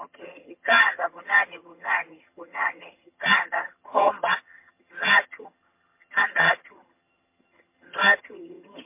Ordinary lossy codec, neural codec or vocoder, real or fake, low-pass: MP3, 24 kbps; vocoder, 22.05 kHz, 80 mel bands, HiFi-GAN; fake; 3.6 kHz